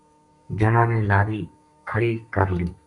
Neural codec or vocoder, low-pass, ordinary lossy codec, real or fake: codec, 32 kHz, 1.9 kbps, SNAC; 10.8 kHz; MP3, 96 kbps; fake